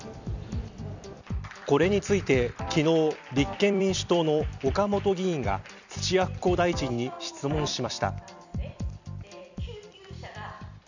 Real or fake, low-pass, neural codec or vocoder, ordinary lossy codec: fake; 7.2 kHz; vocoder, 44.1 kHz, 128 mel bands every 256 samples, BigVGAN v2; none